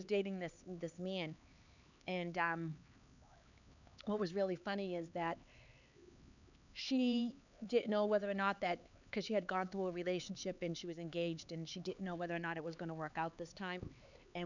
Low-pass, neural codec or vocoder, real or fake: 7.2 kHz; codec, 16 kHz, 4 kbps, X-Codec, HuBERT features, trained on LibriSpeech; fake